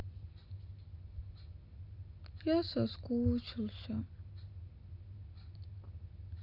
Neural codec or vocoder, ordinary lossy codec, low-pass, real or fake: none; none; 5.4 kHz; real